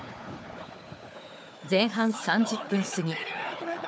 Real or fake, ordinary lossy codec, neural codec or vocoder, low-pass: fake; none; codec, 16 kHz, 4 kbps, FunCodec, trained on Chinese and English, 50 frames a second; none